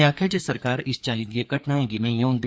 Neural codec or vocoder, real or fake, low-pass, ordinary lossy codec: codec, 16 kHz, 2 kbps, FreqCodec, larger model; fake; none; none